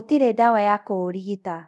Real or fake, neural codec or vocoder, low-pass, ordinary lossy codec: fake; codec, 24 kHz, 0.5 kbps, DualCodec; none; none